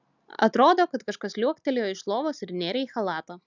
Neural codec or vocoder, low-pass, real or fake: none; 7.2 kHz; real